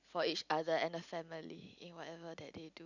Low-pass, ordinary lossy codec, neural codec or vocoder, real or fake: 7.2 kHz; none; none; real